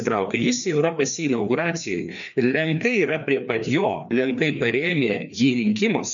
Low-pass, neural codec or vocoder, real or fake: 7.2 kHz; codec, 16 kHz, 2 kbps, FreqCodec, larger model; fake